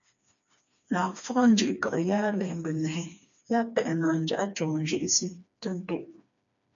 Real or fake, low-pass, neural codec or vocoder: fake; 7.2 kHz; codec, 16 kHz, 2 kbps, FreqCodec, smaller model